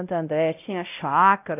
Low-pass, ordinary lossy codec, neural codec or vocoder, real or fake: 3.6 kHz; none; codec, 16 kHz, 0.5 kbps, X-Codec, WavLM features, trained on Multilingual LibriSpeech; fake